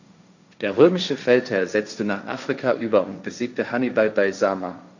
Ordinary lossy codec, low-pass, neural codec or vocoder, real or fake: none; 7.2 kHz; codec, 16 kHz, 1.1 kbps, Voila-Tokenizer; fake